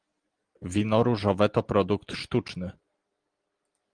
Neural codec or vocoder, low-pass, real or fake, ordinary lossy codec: none; 9.9 kHz; real; Opus, 24 kbps